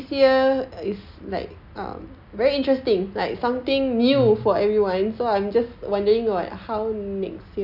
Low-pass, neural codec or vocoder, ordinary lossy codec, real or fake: 5.4 kHz; none; none; real